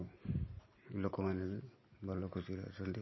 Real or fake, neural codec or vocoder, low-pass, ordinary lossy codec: real; none; 7.2 kHz; MP3, 24 kbps